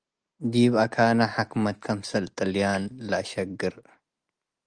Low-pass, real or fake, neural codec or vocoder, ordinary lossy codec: 9.9 kHz; real; none; Opus, 24 kbps